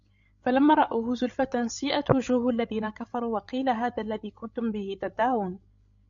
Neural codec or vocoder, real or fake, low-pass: codec, 16 kHz, 16 kbps, FreqCodec, larger model; fake; 7.2 kHz